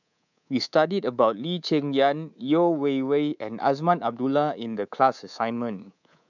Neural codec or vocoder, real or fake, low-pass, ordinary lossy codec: codec, 24 kHz, 3.1 kbps, DualCodec; fake; 7.2 kHz; none